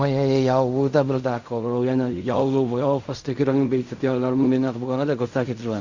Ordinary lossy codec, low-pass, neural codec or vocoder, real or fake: Opus, 64 kbps; 7.2 kHz; codec, 16 kHz in and 24 kHz out, 0.4 kbps, LongCat-Audio-Codec, fine tuned four codebook decoder; fake